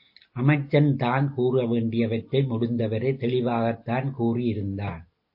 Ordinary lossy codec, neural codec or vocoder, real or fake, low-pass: MP3, 32 kbps; none; real; 5.4 kHz